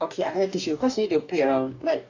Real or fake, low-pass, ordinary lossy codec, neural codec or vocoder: fake; 7.2 kHz; none; codec, 44.1 kHz, 2.6 kbps, DAC